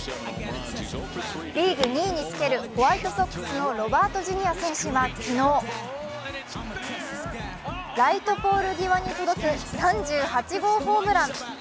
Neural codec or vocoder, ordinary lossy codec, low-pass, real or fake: none; none; none; real